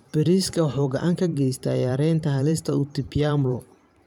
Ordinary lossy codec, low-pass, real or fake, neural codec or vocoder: none; 19.8 kHz; fake; vocoder, 44.1 kHz, 128 mel bands every 512 samples, BigVGAN v2